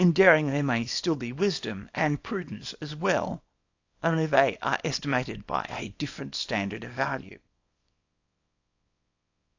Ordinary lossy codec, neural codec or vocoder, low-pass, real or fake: AAC, 48 kbps; codec, 24 kHz, 0.9 kbps, WavTokenizer, small release; 7.2 kHz; fake